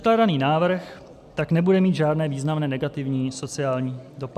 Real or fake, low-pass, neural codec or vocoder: real; 14.4 kHz; none